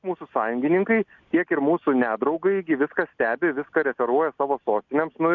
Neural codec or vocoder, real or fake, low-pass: none; real; 7.2 kHz